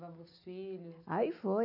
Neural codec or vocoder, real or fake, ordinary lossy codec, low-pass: none; real; none; 5.4 kHz